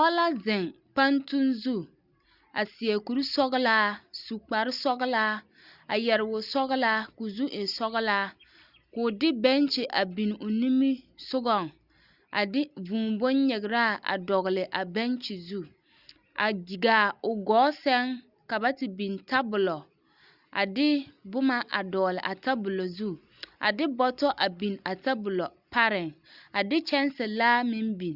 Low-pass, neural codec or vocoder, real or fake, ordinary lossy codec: 5.4 kHz; none; real; Opus, 64 kbps